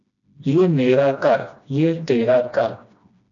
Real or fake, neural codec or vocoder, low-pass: fake; codec, 16 kHz, 1 kbps, FreqCodec, smaller model; 7.2 kHz